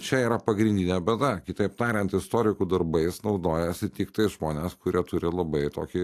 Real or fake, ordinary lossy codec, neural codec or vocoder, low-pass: real; AAC, 64 kbps; none; 14.4 kHz